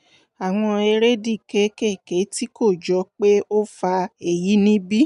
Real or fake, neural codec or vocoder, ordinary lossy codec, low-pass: real; none; none; 10.8 kHz